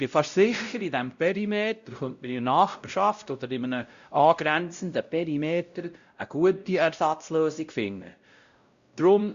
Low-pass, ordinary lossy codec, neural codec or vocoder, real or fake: 7.2 kHz; Opus, 64 kbps; codec, 16 kHz, 0.5 kbps, X-Codec, WavLM features, trained on Multilingual LibriSpeech; fake